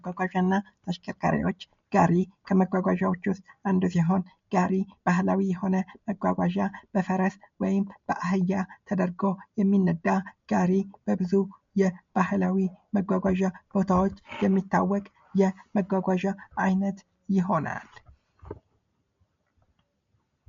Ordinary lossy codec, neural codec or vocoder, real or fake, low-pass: MP3, 48 kbps; none; real; 7.2 kHz